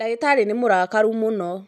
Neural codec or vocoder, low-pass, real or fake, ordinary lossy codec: none; none; real; none